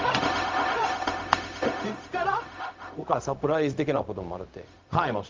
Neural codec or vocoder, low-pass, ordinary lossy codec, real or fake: codec, 16 kHz, 0.4 kbps, LongCat-Audio-Codec; 7.2 kHz; Opus, 32 kbps; fake